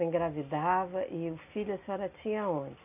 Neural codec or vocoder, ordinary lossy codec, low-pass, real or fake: none; none; 3.6 kHz; real